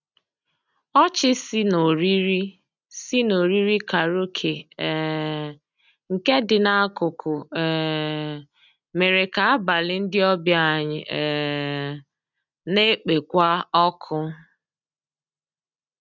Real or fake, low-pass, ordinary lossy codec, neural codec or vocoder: real; 7.2 kHz; none; none